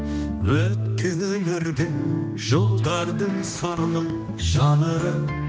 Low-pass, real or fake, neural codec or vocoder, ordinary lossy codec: none; fake; codec, 16 kHz, 1 kbps, X-Codec, HuBERT features, trained on general audio; none